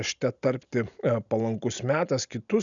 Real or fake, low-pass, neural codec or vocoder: real; 7.2 kHz; none